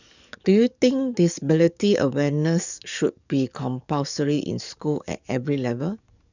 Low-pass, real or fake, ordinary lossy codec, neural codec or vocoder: 7.2 kHz; fake; none; codec, 44.1 kHz, 7.8 kbps, DAC